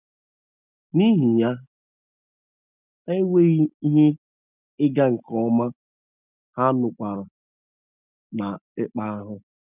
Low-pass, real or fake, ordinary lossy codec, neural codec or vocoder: 3.6 kHz; real; none; none